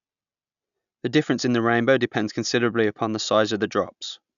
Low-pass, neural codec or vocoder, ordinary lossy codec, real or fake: 7.2 kHz; none; none; real